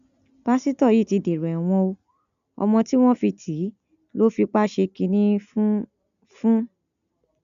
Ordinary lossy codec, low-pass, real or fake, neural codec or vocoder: none; 7.2 kHz; real; none